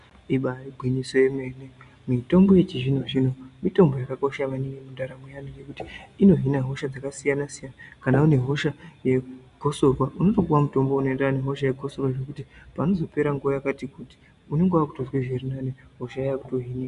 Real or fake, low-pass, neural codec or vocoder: real; 10.8 kHz; none